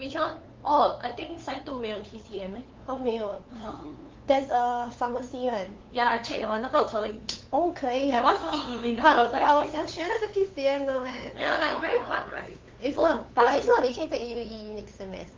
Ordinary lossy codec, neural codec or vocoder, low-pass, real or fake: Opus, 32 kbps; codec, 16 kHz, 2 kbps, FunCodec, trained on LibriTTS, 25 frames a second; 7.2 kHz; fake